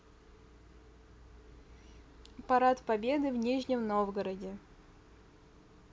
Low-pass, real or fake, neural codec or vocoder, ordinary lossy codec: none; real; none; none